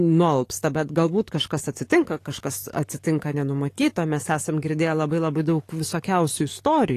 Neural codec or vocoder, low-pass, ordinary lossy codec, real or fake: codec, 44.1 kHz, 7.8 kbps, DAC; 14.4 kHz; AAC, 48 kbps; fake